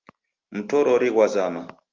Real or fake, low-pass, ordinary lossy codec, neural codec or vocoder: real; 7.2 kHz; Opus, 32 kbps; none